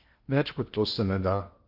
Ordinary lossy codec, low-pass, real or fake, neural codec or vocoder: Opus, 32 kbps; 5.4 kHz; fake; codec, 16 kHz in and 24 kHz out, 0.8 kbps, FocalCodec, streaming, 65536 codes